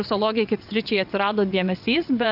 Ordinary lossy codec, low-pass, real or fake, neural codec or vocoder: AAC, 48 kbps; 5.4 kHz; fake; vocoder, 22.05 kHz, 80 mel bands, WaveNeXt